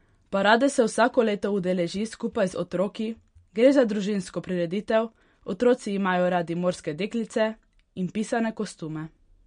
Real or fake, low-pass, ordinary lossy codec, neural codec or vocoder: real; 9.9 kHz; MP3, 48 kbps; none